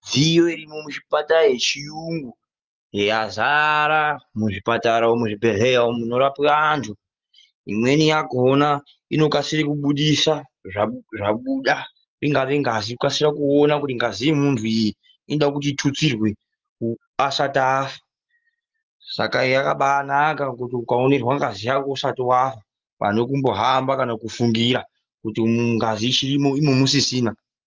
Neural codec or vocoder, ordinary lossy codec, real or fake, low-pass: none; Opus, 32 kbps; real; 7.2 kHz